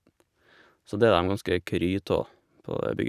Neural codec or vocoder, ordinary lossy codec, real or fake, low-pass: none; none; real; 14.4 kHz